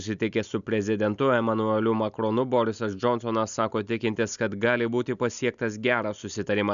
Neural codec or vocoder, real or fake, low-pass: none; real; 7.2 kHz